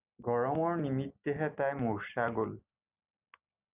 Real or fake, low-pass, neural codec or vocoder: real; 3.6 kHz; none